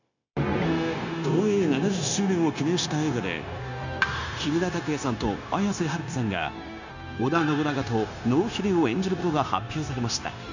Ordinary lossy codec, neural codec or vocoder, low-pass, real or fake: none; codec, 16 kHz, 0.9 kbps, LongCat-Audio-Codec; 7.2 kHz; fake